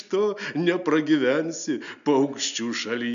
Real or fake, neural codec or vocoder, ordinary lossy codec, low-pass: real; none; AAC, 96 kbps; 7.2 kHz